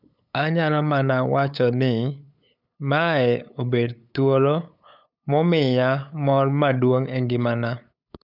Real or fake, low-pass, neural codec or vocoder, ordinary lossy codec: fake; 5.4 kHz; codec, 16 kHz, 8 kbps, FunCodec, trained on LibriTTS, 25 frames a second; none